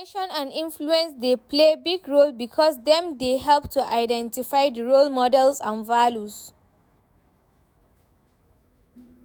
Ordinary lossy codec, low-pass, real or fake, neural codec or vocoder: none; none; fake; autoencoder, 48 kHz, 128 numbers a frame, DAC-VAE, trained on Japanese speech